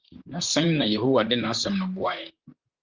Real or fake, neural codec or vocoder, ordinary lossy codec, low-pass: fake; vocoder, 44.1 kHz, 128 mel bands, Pupu-Vocoder; Opus, 24 kbps; 7.2 kHz